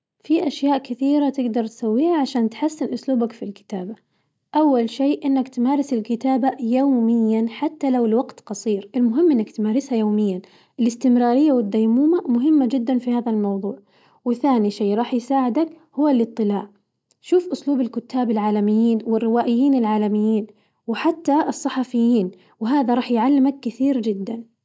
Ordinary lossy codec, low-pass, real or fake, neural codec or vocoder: none; none; real; none